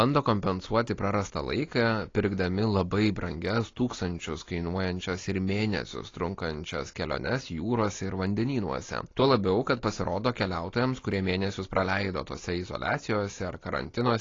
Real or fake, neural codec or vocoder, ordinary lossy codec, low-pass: real; none; AAC, 32 kbps; 7.2 kHz